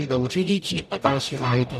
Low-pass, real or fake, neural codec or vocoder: 14.4 kHz; fake; codec, 44.1 kHz, 0.9 kbps, DAC